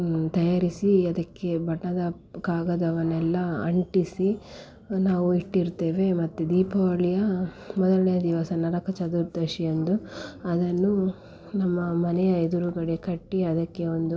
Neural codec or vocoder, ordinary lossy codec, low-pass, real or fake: none; none; none; real